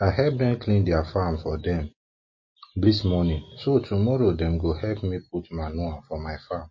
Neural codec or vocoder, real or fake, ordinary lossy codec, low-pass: none; real; MP3, 32 kbps; 7.2 kHz